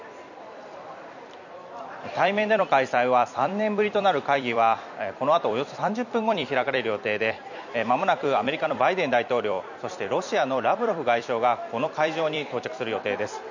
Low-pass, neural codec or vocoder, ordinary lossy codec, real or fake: 7.2 kHz; vocoder, 44.1 kHz, 128 mel bands every 256 samples, BigVGAN v2; none; fake